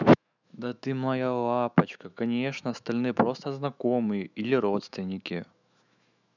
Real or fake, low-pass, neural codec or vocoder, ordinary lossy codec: fake; 7.2 kHz; autoencoder, 48 kHz, 128 numbers a frame, DAC-VAE, trained on Japanese speech; none